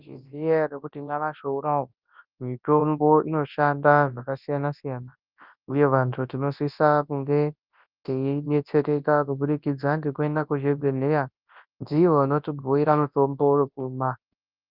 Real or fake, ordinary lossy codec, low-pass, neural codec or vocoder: fake; Opus, 24 kbps; 5.4 kHz; codec, 24 kHz, 0.9 kbps, WavTokenizer, large speech release